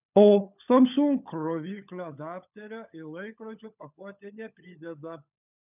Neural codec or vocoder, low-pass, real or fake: codec, 16 kHz, 16 kbps, FunCodec, trained on LibriTTS, 50 frames a second; 3.6 kHz; fake